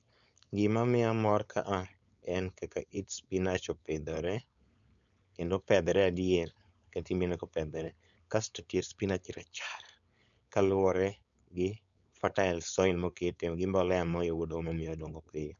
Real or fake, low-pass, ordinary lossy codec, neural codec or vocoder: fake; 7.2 kHz; none; codec, 16 kHz, 4.8 kbps, FACodec